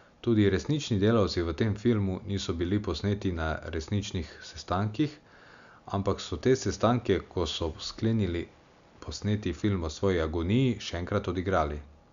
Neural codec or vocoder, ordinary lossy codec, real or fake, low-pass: none; Opus, 64 kbps; real; 7.2 kHz